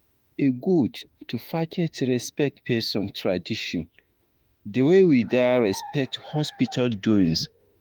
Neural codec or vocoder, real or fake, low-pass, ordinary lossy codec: autoencoder, 48 kHz, 32 numbers a frame, DAC-VAE, trained on Japanese speech; fake; 19.8 kHz; Opus, 32 kbps